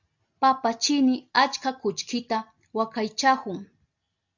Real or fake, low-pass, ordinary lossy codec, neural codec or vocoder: real; 7.2 kHz; MP3, 64 kbps; none